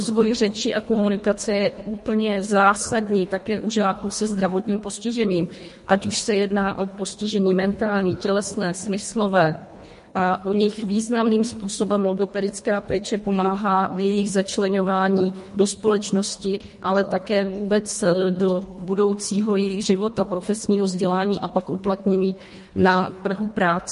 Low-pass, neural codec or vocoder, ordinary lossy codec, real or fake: 10.8 kHz; codec, 24 kHz, 1.5 kbps, HILCodec; MP3, 48 kbps; fake